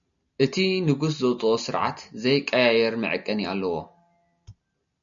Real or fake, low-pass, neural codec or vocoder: real; 7.2 kHz; none